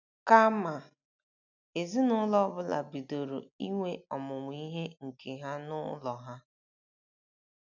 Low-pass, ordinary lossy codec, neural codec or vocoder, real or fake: 7.2 kHz; none; none; real